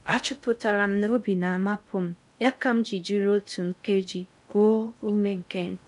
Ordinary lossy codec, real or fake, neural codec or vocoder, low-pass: none; fake; codec, 16 kHz in and 24 kHz out, 0.6 kbps, FocalCodec, streaming, 2048 codes; 10.8 kHz